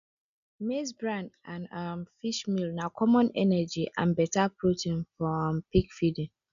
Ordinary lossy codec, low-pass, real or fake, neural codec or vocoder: none; 7.2 kHz; real; none